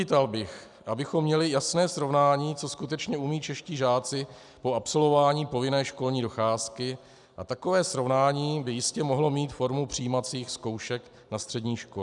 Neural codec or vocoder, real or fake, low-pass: none; real; 10.8 kHz